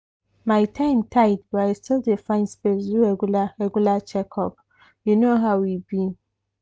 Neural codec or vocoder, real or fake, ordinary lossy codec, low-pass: none; real; none; none